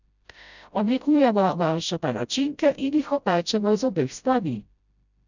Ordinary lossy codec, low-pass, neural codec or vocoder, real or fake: none; 7.2 kHz; codec, 16 kHz, 0.5 kbps, FreqCodec, smaller model; fake